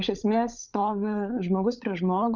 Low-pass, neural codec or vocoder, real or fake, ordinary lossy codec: 7.2 kHz; codec, 16 kHz, 16 kbps, FunCodec, trained on LibriTTS, 50 frames a second; fake; Opus, 64 kbps